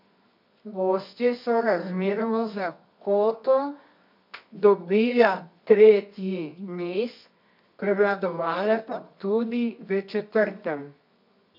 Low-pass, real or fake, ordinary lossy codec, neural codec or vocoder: 5.4 kHz; fake; MP3, 48 kbps; codec, 24 kHz, 0.9 kbps, WavTokenizer, medium music audio release